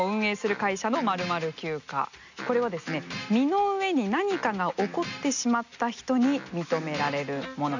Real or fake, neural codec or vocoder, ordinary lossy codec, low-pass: real; none; none; 7.2 kHz